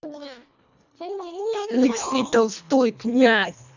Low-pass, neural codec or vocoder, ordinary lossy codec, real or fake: 7.2 kHz; codec, 24 kHz, 1.5 kbps, HILCodec; none; fake